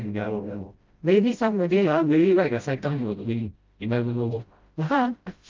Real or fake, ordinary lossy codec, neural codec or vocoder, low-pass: fake; Opus, 24 kbps; codec, 16 kHz, 0.5 kbps, FreqCodec, smaller model; 7.2 kHz